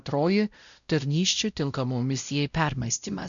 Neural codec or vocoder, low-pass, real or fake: codec, 16 kHz, 0.5 kbps, X-Codec, WavLM features, trained on Multilingual LibriSpeech; 7.2 kHz; fake